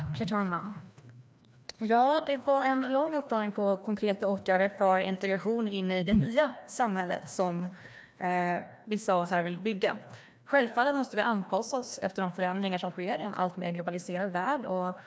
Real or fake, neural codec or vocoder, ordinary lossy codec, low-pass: fake; codec, 16 kHz, 1 kbps, FreqCodec, larger model; none; none